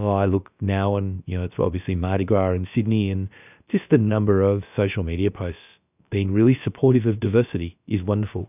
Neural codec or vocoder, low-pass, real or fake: codec, 16 kHz, about 1 kbps, DyCAST, with the encoder's durations; 3.6 kHz; fake